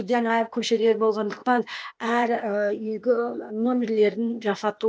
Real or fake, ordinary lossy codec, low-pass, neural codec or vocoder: fake; none; none; codec, 16 kHz, 0.8 kbps, ZipCodec